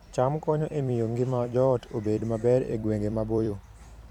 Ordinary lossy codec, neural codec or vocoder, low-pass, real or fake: none; vocoder, 44.1 kHz, 128 mel bands every 256 samples, BigVGAN v2; 19.8 kHz; fake